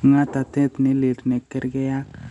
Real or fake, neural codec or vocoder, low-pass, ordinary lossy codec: real; none; 10.8 kHz; none